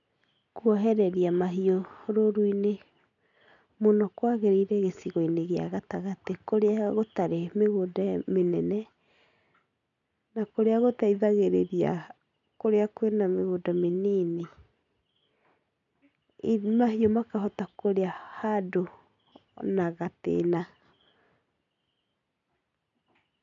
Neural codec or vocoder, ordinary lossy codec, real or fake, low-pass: none; none; real; 7.2 kHz